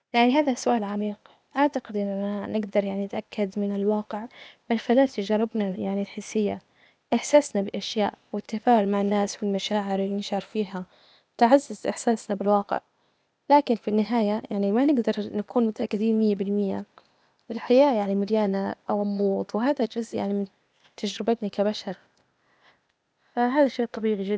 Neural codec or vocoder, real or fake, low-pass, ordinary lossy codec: codec, 16 kHz, 0.8 kbps, ZipCodec; fake; none; none